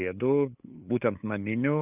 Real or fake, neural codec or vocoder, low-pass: fake; codec, 16 kHz, 8 kbps, FunCodec, trained on LibriTTS, 25 frames a second; 3.6 kHz